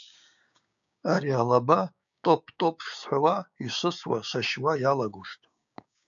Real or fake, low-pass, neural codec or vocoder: fake; 7.2 kHz; codec, 16 kHz, 6 kbps, DAC